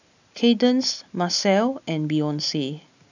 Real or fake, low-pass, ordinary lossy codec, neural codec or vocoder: real; 7.2 kHz; none; none